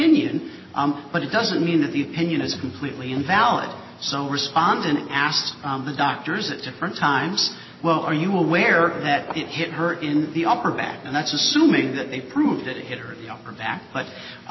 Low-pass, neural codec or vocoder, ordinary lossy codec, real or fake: 7.2 kHz; none; MP3, 24 kbps; real